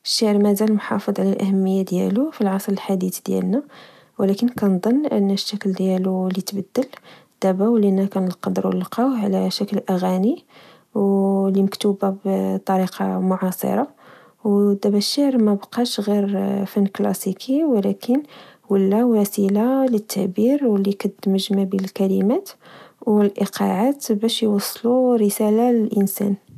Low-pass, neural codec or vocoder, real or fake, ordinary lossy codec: 14.4 kHz; none; real; none